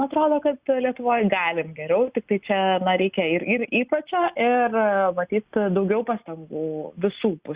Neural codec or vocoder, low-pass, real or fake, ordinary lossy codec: none; 3.6 kHz; real; Opus, 64 kbps